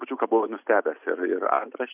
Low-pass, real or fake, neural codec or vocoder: 3.6 kHz; real; none